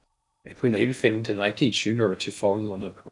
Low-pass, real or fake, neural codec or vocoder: 10.8 kHz; fake; codec, 16 kHz in and 24 kHz out, 0.6 kbps, FocalCodec, streaming, 4096 codes